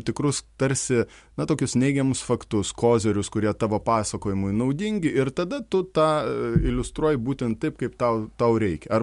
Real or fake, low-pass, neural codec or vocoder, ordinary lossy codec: real; 10.8 kHz; none; MP3, 64 kbps